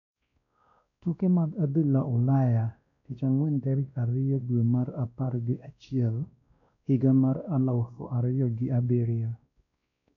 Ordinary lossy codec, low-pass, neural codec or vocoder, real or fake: none; 7.2 kHz; codec, 16 kHz, 1 kbps, X-Codec, WavLM features, trained on Multilingual LibriSpeech; fake